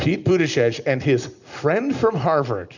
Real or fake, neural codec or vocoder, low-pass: real; none; 7.2 kHz